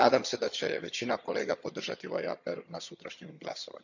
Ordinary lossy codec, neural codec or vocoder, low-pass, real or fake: none; vocoder, 22.05 kHz, 80 mel bands, HiFi-GAN; 7.2 kHz; fake